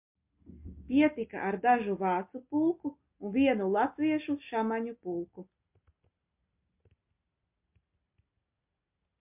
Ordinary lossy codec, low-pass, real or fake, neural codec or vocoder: MP3, 32 kbps; 3.6 kHz; real; none